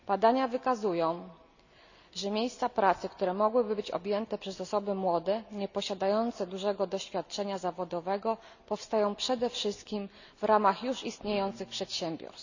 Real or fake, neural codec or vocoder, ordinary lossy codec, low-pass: real; none; none; 7.2 kHz